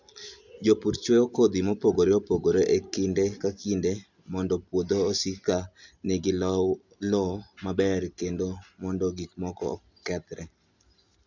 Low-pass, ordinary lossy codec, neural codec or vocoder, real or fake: 7.2 kHz; none; none; real